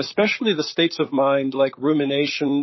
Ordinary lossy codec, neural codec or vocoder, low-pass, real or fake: MP3, 24 kbps; vocoder, 44.1 kHz, 128 mel bands, Pupu-Vocoder; 7.2 kHz; fake